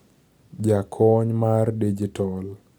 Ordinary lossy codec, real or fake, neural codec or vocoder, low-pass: none; real; none; none